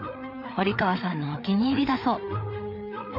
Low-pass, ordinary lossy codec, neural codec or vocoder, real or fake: 5.4 kHz; MP3, 48 kbps; codec, 16 kHz, 4 kbps, FreqCodec, larger model; fake